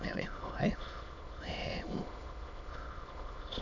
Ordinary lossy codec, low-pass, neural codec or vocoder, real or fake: none; 7.2 kHz; autoencoder, 22.05 kHz, a latent of 192 numbers a frame, VITS, trained on many speakers; fake